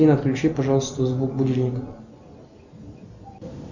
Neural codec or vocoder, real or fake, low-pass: none; real; 7.2 kHz